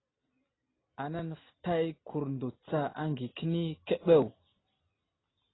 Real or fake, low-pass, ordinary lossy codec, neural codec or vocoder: real; 7.2 kHz; AAC, 16 kbps; none